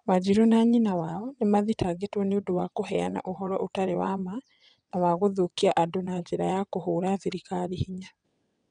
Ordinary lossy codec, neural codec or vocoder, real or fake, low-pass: none; vocoder, 22.05 kHz, 80 mel bands, WaveNeXt; fake; 9.9 kHz